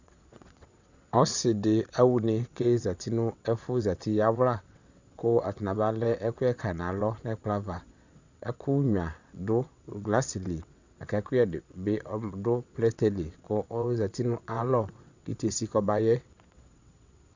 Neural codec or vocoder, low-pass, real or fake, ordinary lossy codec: vocoder, 22.05 kHz, 80 mel bands, WaveNeXt; 7.2 kHz; fake; Opus, 64 kbps